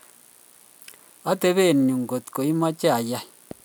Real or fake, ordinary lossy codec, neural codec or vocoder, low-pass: real; none; none; none